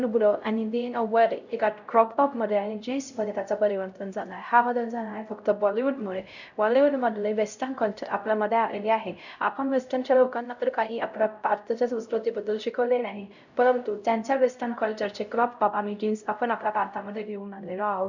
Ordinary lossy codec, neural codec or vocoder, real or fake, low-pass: none; codec, 16 kHz, 0.5 kbps, X-Codec, HuBERT features, trained on LibriSpeech; fake; 7.2 kHz